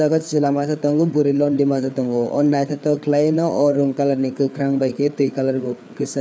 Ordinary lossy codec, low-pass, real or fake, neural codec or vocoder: none; none; fake; codec, 16 kHz, 4 kbps, FreqCodec, larger model